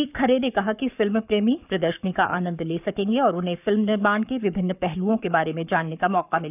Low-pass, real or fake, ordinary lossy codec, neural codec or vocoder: 3.6 kHz; fake; none; codec, 44.1 kHz, 7.8 kbps, Pupu-Codec